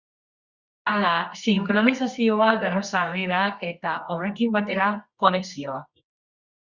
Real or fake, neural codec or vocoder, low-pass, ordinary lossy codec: fake; codec, 24 kHz, 0.9 kbps, WavTokenizer, medium music audio release; 7.2 kHz; Opus, 64 kbps